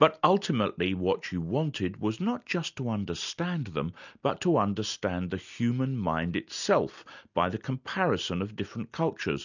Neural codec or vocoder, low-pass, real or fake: none; 7.2 kHz; real